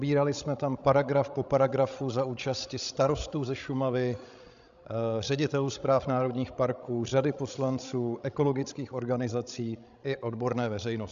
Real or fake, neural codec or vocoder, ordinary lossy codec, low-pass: fake; codec, 16 kHz, 16 kbps, FreqCodec, larger model; AAC, 96 kbps; 7.2 kHz